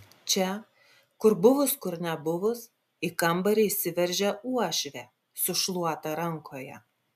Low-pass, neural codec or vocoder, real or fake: 14.4 kHz; none; real